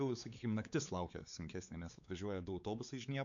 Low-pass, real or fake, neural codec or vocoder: 7.2 kHz; fake; codec, 16 kHz, 4 kbps, X-Codec, WavLM features, trained on Multilingual LibriSpeech